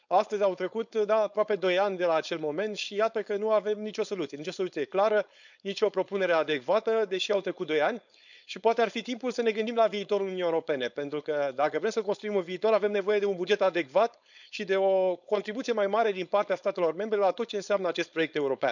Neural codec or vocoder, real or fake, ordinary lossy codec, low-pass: codec, 16 kHz, 4.8 kbps, FACodec; fake; none; 7.2 kHz